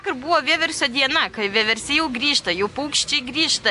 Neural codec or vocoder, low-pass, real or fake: none; 10.8 kHz; real